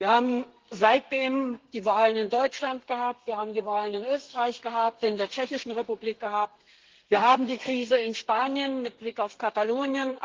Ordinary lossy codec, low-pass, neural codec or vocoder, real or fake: Opus, 16 kbps; 7.2 kHz; codec, 32 kHz, 1.9 kbps, SNAC; fake